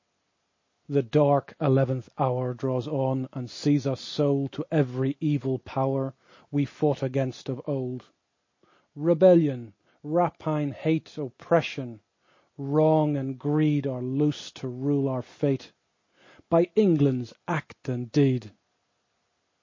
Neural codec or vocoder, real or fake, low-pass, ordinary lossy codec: none; real; 7.2 kHz; MP3, 32 kbps